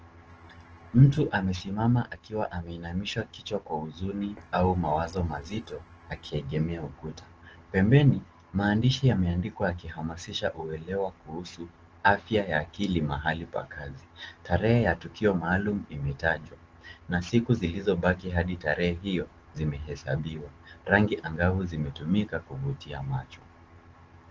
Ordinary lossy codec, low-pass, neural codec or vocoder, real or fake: Opus, 16 kbps; 7.2 kHz; none; real